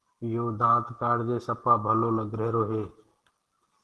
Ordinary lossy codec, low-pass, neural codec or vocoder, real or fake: Opus, 16 kbps; 10.8 kHz; none; real